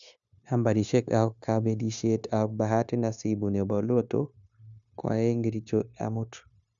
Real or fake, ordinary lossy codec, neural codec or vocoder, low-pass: fake; none; codec, 16 kHz, 0.9 kbps, LongCat-Audio-Codec; 7.2 kHz